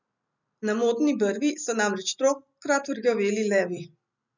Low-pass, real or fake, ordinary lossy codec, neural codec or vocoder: 7.2 kHz; real; none; none